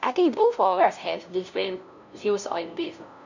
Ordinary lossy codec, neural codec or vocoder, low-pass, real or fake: none; codec, 16 kHz, 0.5 kbps, FunCodec, trained on LibriTTS, 25 frames a second; 7.2 kHz; fake